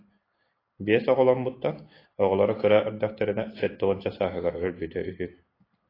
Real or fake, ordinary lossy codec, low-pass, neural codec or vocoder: real; AAC, 24 kbps; 5.4 kHz; none